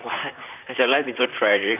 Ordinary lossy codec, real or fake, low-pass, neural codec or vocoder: none; fake; 3.6 kHz; vocoder, 44.1 kHz, 128 mel bands, Pupu-Vocoder